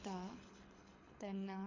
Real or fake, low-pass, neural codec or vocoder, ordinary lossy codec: fake; 7.2 kHz; codec, 24 kHz, 6 kbps, HILCodec; AAC, 48 kbps